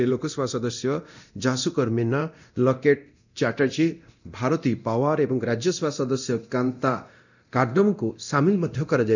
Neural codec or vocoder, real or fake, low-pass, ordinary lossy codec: codec, 24 kHz, 0.9 kbps, DualCodec; fake; 7.2 kHz; none